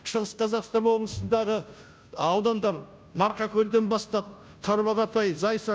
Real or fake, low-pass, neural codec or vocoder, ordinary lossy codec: fake; none; codec, 16 kHz, 0.5 kbps, FunCodec, trained on Chinese and English, 25 frames a second; none